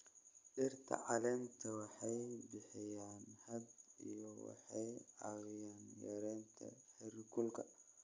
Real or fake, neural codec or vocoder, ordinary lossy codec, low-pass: real; none; MP3, 64 kbps; 7.2 kHz